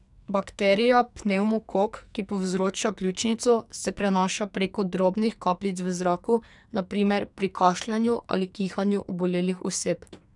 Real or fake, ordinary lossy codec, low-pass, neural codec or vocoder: fake; none; 10.8 kHz; codec, 44.1 kHz, 2.6 kbps, SNAC